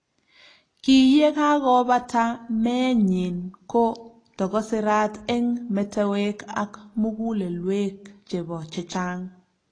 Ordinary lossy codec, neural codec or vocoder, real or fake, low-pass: AAC, 32 kbps; none; real; 9.9 kHz